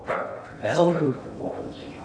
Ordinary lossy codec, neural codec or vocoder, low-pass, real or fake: AAC, 48 kbps; codec, 16 kHz in and 24 kHz out, 0.6 kbps, FocalCodec, streaming, 2048 codes; 9.9 kHz; fake